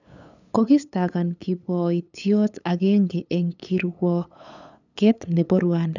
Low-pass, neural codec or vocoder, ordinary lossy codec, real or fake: 7.2 kHz; codec, 16 kHz, 8 kbps, FunCodec, trained on LibriTTS, 25 frames a second; none; fake